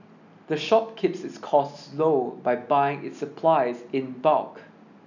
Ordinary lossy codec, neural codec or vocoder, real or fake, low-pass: none; none; real; 7.2 kHz